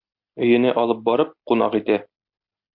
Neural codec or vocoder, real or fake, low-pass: none; real; 5.4 kHz